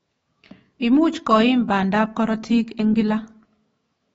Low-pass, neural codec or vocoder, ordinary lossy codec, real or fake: 19.8 kHz; autoencoder, 48 kHz, 128 numbers a frame, DAC-VAE, trained on Japanese speech; AAC, 24 kbps; fake